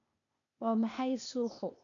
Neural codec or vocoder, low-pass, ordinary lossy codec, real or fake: codec, 16 kHz, 0.8 kbps, ZipCodec; 7.2 kHz; none; fake